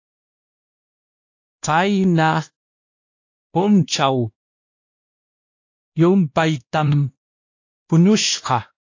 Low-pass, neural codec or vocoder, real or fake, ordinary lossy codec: 7.2 kHz; codec, 16 kHz, 1 kbps, X-Codec, WavLM features, trained on Multilingual LibriSpeech; fake; AAC, 48 kbps